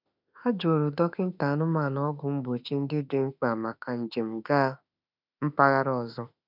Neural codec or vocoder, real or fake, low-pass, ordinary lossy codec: autoencoder, 48 kHz, 32 numbers a frame, DAC-VAE, trained on Japanese speech; fake; 5.4 kHz; none